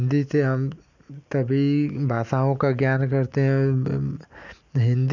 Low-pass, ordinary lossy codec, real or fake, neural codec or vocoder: 7.2 kHz; none; real; none